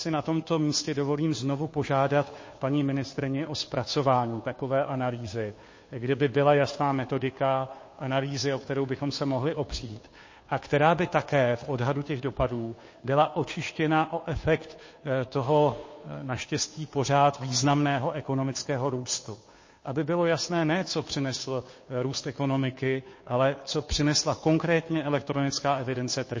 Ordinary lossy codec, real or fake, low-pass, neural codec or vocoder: MP3, 32 kbps; fake; 7.2 kHz; codec, 16 kHz, 2 kbps, FunCodec, trained on Chinese and English, 25 frames a second